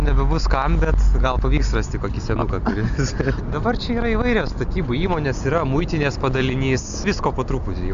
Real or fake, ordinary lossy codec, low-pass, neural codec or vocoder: real; MP3, 64 kbps; 7.2 kHz; none